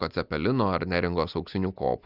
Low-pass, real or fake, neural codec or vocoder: 5.4 kHz; real; none